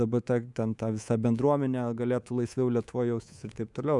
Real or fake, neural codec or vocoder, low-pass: fake; codec, 24 kHz, 3.1 kbps, DualCodec; 10.8 kHz